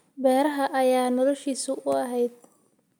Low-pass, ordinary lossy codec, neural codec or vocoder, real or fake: none; none; none; real